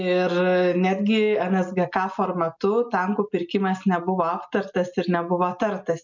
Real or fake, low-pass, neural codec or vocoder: real; 7.2 kHz; none